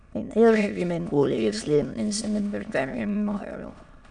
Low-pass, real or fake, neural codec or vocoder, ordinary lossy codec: 9.9 kHz; fake; autoencoder, 22.05 kHz, a latent of 192 numbers a frame, VITS, trained on many speakers; none